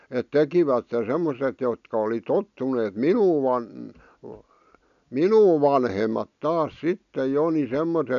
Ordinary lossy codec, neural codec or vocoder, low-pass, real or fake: none; none; 7.2 kHz; real